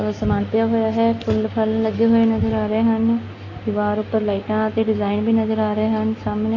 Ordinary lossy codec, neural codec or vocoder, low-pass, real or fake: AAC, 32 kbps; none; 7.2 kHz; real